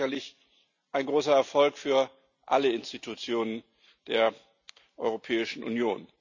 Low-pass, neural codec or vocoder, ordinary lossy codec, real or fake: 7.2 kHz; none; none; real